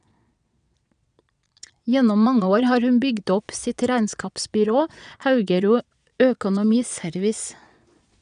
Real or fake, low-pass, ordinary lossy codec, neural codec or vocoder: fake; 9.9 kHz; none; vocoder, 22.05 kHz, 80 mel bands, Vocos